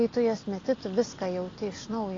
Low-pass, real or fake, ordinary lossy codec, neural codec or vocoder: 7.2 kHz; real; AAC, 32 kbps; none